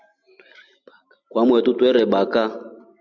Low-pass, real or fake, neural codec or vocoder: 7.2 kHz; real; none